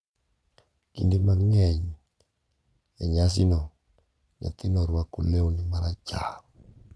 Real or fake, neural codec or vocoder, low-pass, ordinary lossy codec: fake; vocoder, 22.05 kHz, 80 mel bands, Vocos; none; none